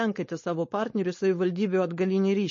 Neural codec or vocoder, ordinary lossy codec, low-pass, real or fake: codec, 16 kHz, 4.8 kbps, FACodec; MP3, 32 kbps; 7.2 kHz; fake